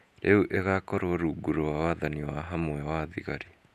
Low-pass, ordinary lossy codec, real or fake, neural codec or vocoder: 14.4 kHz; none; fake; vocoder, 48 kHz, 128 mel bands, Vocos